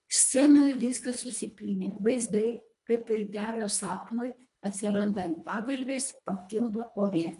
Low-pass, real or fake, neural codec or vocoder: 10.8 kHz; fake; codec, 24 kHz, 1.5 kbps, HILCodec